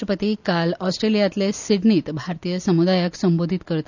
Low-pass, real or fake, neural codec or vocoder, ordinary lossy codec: 7.2 kHz; real; none; none